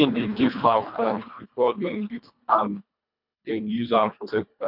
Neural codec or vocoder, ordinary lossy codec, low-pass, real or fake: codec, 24 kHz, 1.5 kbps, HILCodec; none; 5.4 kHz; fake